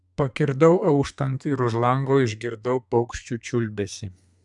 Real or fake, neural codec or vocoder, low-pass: fake; codec, 32 kHz, 1.9 kbps, SNAC; 10.8 kHz